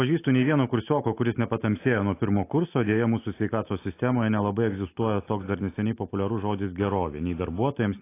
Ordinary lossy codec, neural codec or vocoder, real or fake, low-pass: AAC, 24 kbps; none; real; 3.6 kHz